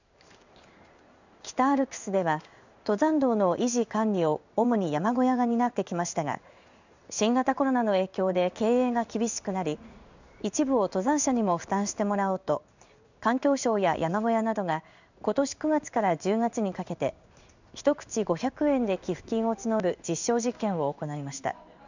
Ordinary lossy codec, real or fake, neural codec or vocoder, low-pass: none; fake; codec, 16 kHz in and 24 kHz out, 1 kbps, XY-Tokenizer; 7.2 kHz